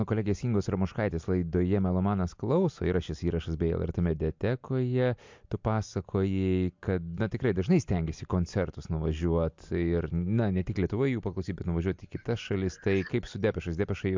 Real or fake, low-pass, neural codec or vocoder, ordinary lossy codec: real; 7.2 kHz; none; MP3, 64 kbps